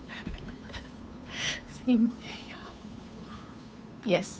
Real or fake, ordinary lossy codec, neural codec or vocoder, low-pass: fake; none; codec, 16 kHz, 2 kbps, FunCodec, trained on Chinese and English, 25 frames a second; none